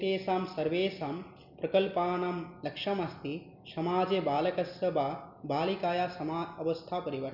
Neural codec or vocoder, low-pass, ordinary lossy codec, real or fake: none; 5.4 kHz; none; real